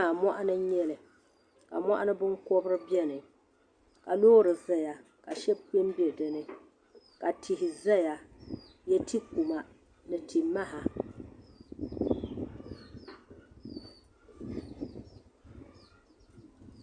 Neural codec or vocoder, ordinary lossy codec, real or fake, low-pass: none; Opus, 64 kbps; real; 9.9 kHz